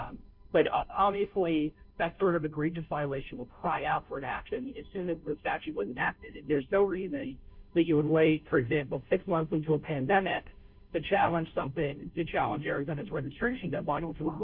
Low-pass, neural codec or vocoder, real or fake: 5.4 kHz; codec, 16 kHz, 0.5 kbps, FunCodec, trained on Chinese and English, 25 frames a second; fake